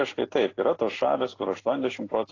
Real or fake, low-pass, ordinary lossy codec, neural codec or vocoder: real; 7.2 kHz; AAC, 32 kbps; none